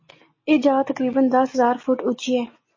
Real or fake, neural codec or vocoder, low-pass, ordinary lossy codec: real; none; 7.2 kHz; MP3, 32 kbps